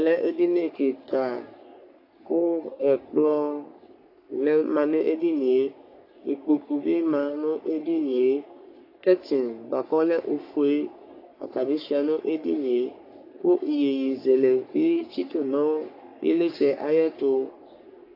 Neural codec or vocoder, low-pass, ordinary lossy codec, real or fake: codec, 44.1 kHz, 3.4 kbps, Pupu-Codec; 5.4 kHz; AAC, 32 kbps; fake